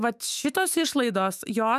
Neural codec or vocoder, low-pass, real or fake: autoencoder, 48 kHz, 128 numbers a frame, DAC-VAE, trained on Japanese speech; 14.4 kHz; fake